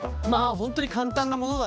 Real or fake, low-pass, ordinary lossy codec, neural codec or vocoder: fake; none; none; codec, 16 kHz, 2 kbps, X-Codec, HuBERT features, trained on balanced general audio